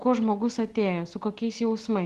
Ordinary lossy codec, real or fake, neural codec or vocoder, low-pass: Opus, 16 kbps; real; none; 10.8 kHz